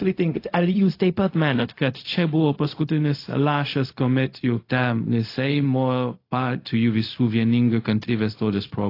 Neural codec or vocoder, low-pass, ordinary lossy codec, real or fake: codec, 16 kHz, 0.4 kbps, LongCat-Audio-Codec; 5.4 kHz; AAC, 32 kbps; fake